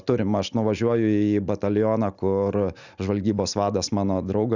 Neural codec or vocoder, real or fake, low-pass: none; real; 7.2 kHz